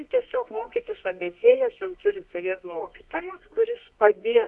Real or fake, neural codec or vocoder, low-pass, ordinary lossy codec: fake; codec, 24 kHz, 0.9 kbps, WavTokenizer, medium music audio release; 10.8 kHz; MP3, 96 kbps